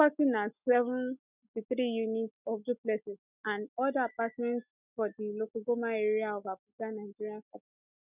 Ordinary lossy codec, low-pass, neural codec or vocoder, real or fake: none; 3.6 kHz; none; real